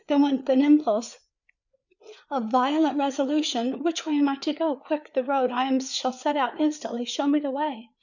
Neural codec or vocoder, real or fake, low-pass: codec, 16 kHz, 4 kbps, FreqCodec, larger model; fake; 7.2 kHz